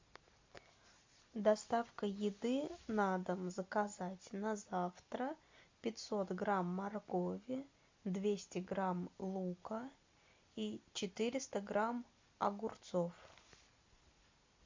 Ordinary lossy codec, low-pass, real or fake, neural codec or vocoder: MP3, 64 kbps; 7.2 kHz; real; none